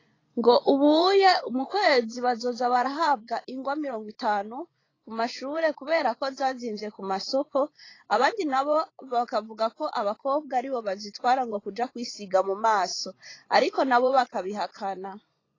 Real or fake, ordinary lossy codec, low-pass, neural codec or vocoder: fake; AAC, 32 kbps; 7.2 kHz; vocoder, 24 kHz, 100 mel bands, Vocos